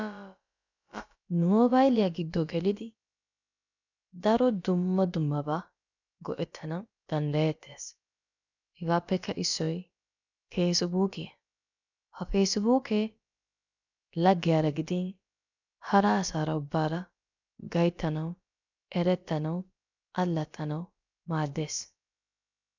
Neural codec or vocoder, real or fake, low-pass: codec, 16 kHz, about 1 kbps, DyCAST, with the encoder's durations; fake; 7.2 kHz